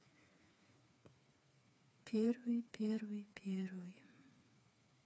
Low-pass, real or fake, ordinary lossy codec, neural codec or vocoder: none; fake; none; codec, 16 kHz, 4 kbps, FreqCodec, smaller model